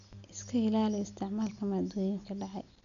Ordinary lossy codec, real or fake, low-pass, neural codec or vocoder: none; real; 7.2 kHz; none